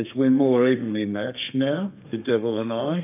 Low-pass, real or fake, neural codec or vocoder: 3.6 kHz; fake; codec, 32 kHz, 1.9 kbps, SNAC